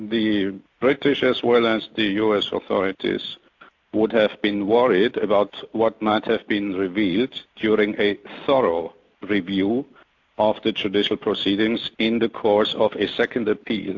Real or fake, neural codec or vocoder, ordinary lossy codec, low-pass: real; none; AAC, 48 kbps; 7.2 kHz